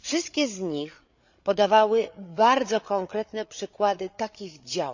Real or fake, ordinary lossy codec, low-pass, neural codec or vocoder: fake; Opus, 64 kbps; 7.2 kHz; codec, 16 kHz, 8 kbps, FreqCodec, larger model